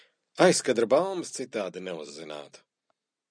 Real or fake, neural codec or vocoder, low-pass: real; none; 9.9 kHz